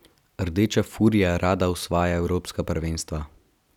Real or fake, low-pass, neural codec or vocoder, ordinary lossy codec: real; 19.8 kHz; none; none